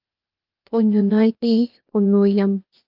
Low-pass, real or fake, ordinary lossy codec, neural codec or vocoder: 5.4 kHz; fake; Opus, 24 kbps; codec, 16 kHz, 0.8 kbps, ZipCodec